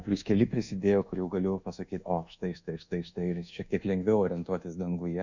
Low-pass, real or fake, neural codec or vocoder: 7.2 kHz; fake; codec, 24 kHz, 1.2 kbps, DualCodec